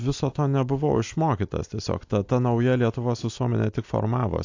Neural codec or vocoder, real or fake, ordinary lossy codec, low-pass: none; real; AAC, 48 kbps; 7.2 kHz